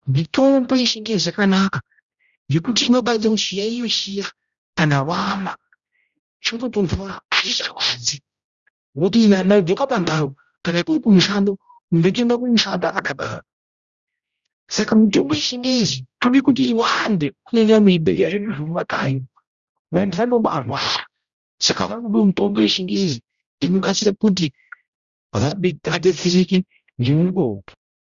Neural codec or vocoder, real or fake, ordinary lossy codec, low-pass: codec, 16 kHz, 0.5 kbps, X-Codec, HuBERT features, trained on general audio; fake; Opus, 64 kbps; 7.2 kHz